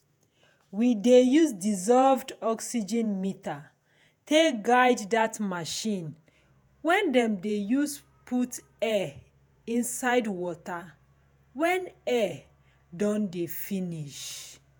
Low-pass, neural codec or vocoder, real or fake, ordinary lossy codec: none; vocoder, 48 kHz, 128 mel bands, Vocos; fake; none